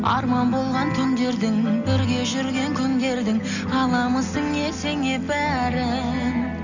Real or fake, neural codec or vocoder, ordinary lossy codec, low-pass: real; none; none; 7.2 kHz